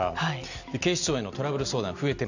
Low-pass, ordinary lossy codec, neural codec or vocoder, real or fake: 7.2 kHz; none; none; real